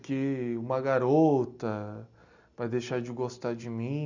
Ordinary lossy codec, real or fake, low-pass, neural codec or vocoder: none; real; 7.2 kHz; none